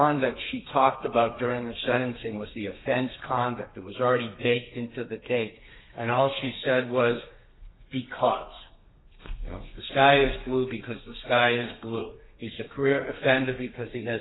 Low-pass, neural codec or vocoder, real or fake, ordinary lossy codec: 7.2 kHz; codec, 32 kHz, 1.9 kbps, SNAC; fake; AAC, 16 kbps